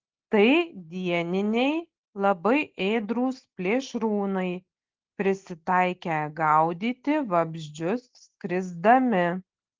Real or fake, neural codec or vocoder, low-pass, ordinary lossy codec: real; none; 7.2 kHz; Opus, 16 kbps